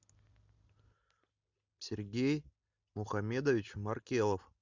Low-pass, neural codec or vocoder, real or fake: 7.2 kHz; none; real